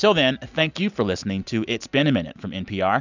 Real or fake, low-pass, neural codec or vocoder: real; 7.2 kHz; none